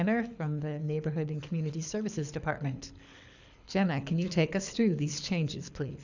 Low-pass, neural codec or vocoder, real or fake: 7.2 kHz; codec, 24 kHz, 6 kbps, HILCodec; fake